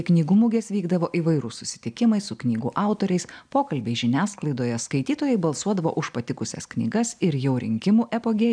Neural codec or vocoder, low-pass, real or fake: none; 9.9 kHz; real